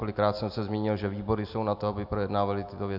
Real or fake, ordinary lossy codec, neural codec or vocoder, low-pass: real; AAC, 48 kbps; none; 5.4 kHz